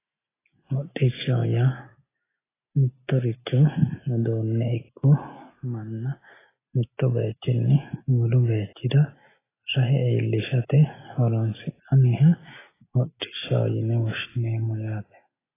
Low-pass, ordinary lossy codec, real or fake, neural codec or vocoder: 3.6 kHz; AAC, 16 kbps; real; none